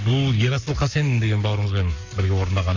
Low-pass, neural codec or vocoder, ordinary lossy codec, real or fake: 7.2 kHz; codec, 44.1 kHz, 7.8 kbps, Pupu-Codec; none; fake